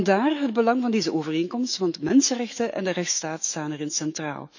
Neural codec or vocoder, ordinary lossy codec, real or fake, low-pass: autoencoder, 48 kHz, 128 numbers a frame, DAC-VAE, trained on Japanese speech; none; fake; 7.2 kHz